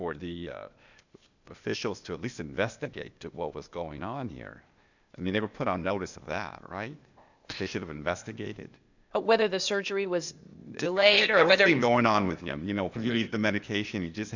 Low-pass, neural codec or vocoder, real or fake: 7.2 kHz; codec, 16 kHz, 0.8 kbps, ZipCodec; fake